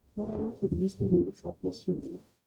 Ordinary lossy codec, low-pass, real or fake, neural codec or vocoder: none; 19.8 kHz; fake; codec, 44.1 kHz, 0.9 kbps, DAC